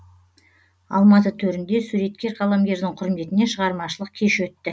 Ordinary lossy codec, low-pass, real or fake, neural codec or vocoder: none; none; real; none